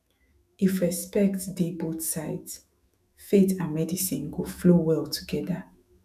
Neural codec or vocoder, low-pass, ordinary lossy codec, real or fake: autoencoder, 48 kHz, 128 numbers a frame, DAC-VAE, trained on Japanese speech; 14.4 kHz; none; fake